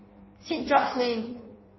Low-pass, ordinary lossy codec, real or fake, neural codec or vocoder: 7.2 kHz; MP3, 24 kbps; fake; codec, 16 kHz in and 24 kHz out, 1.1 kbps, FireRedTTS-2 codec